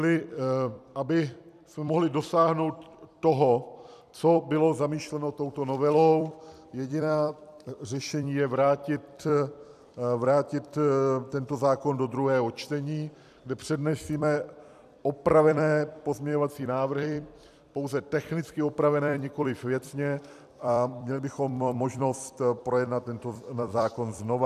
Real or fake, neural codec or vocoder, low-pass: fake; vocoder, 44.1 kHz, 128 mel bands every 256 samples, BigVGAN v2; 14.4 kHz